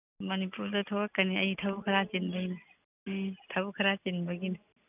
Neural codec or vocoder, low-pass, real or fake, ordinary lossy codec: none; 3.6 kHz; real; none